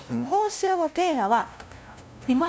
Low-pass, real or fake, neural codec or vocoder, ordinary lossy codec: none; fake; codec, 16 kHz, 0.5 kbps, FunCodec, trained on LibriTTS, 25 frames a second; none